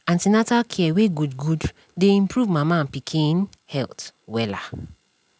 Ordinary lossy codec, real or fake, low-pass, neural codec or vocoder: none; real; none; none